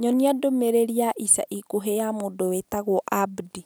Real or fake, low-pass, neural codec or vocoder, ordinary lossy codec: real; none; none; none